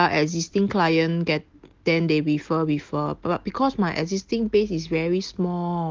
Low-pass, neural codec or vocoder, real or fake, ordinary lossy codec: 7.2 kHz; none; real; Opus, 16 kbps